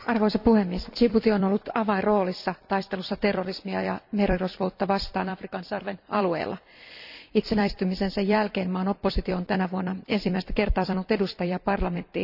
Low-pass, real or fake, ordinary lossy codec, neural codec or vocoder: 5.4 kHz; fake; none; vocoder, 44.1 kHz, 128 mel bands every 256 samples, BigVGAN v2